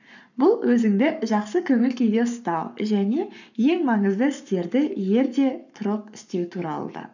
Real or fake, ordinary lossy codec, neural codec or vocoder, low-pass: fake; none; codec, 44.1 kHz, 7.8 kbps, Pupu-Codec; 7.2 kHz